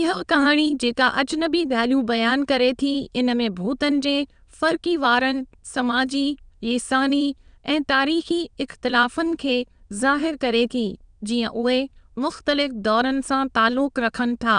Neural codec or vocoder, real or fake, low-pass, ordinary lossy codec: autoencoder, 22.05 kHz, a latent of 192 numbers a frame, VITS, trained on many speakers; fake; 9.9 kHz; none